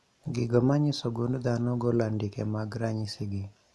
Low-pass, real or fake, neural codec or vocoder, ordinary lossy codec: none; real; none; none